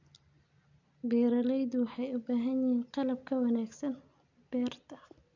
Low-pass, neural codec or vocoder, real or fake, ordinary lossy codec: 7.2 kHz; none; real; none